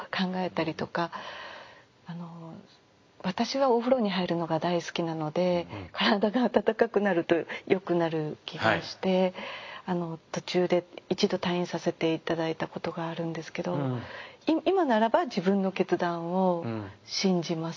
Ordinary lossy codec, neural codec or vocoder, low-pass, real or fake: MP3, 48 kbps; none; 7.2 kHz; real